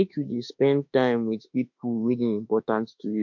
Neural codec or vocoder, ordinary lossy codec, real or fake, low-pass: autoencoder, 48 kHz, 32 numbers a frame, DAC-VAE, trained on Japanese speech; MP3, 48 kbps; fake; 7.2 kHz